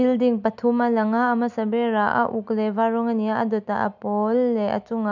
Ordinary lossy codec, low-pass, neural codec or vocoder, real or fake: none; 7.2 kHz; none; real